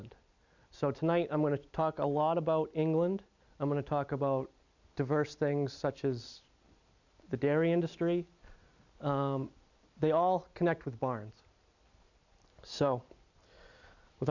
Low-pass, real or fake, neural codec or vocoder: 7.2 kHz; real; none